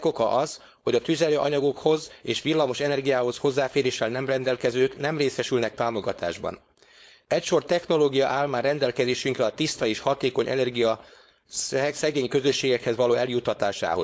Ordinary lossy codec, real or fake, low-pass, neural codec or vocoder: none; fake; none; codec, 16 kHz, 4.8 kbps, FACodec